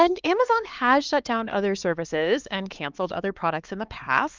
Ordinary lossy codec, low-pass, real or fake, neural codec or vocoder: Opus, 24 kbps; 7.2 kHz; fake; codec, 16 kHz, 2 kbps, X-Codec, HuBERT features, trained on LibriSpeech